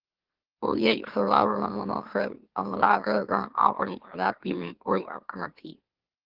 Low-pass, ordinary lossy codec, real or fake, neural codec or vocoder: 5.4 kHz; Opus, 16 kbps; fake; autoencoder, 44.1 kHz, a latent of 192 numbers a frame, MeloTTS